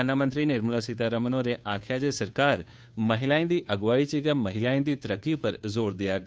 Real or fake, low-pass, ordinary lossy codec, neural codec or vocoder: fake; none; none; codec, 16 kHz, 2 kbps, FunCodec, trained on Chinese and English, 25 frames a second